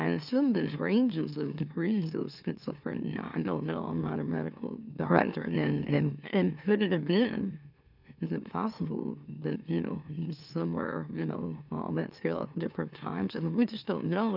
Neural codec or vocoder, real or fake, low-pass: autoencoder, 44.1 kHz, a latent of 192 numbers a frame, MeloTTS; fake; 5.4 kHz